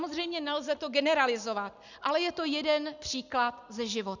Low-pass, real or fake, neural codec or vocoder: 7.2 kHz; real; none